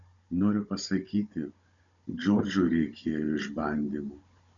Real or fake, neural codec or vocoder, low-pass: fake; codec, 16 kHz, 16 kbps, FunCodec, trained on Chinese and English, 50 frames a second; 7.2 kHz